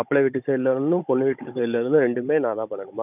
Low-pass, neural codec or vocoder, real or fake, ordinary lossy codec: 3.6 kHz; codec, 16 kHz, 16 kbps, FunCodec, trained on Chinese and English, 50 frames a second; fake; none